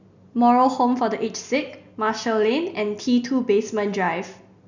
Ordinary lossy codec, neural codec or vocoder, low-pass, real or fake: none; none; 7.2 kHz; real